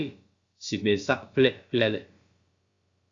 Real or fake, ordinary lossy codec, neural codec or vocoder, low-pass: fake; Opus, 64 kbps; codec, 16 kHz, about 1 kbps, DyCAST, with the encoder's durations; 7.2 kHz